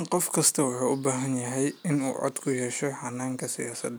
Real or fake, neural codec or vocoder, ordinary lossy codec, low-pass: real; none; none; none